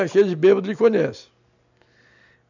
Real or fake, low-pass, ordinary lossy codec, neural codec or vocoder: real; 7.2 kHz; none; none